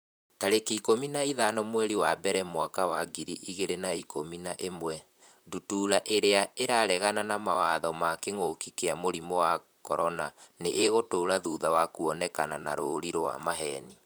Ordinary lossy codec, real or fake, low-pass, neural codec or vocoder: none; fake; none; vocoder, 44.1 kHz, 128 mel bands, Pupu-Vocoder